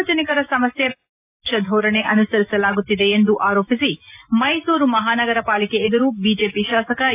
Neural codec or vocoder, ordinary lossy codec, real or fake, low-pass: none; AAC, 32 kbps; real; 3.6 kHz